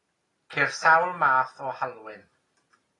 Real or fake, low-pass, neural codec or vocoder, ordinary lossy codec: real; 10.8 kHz; none; AAC, 32 kbps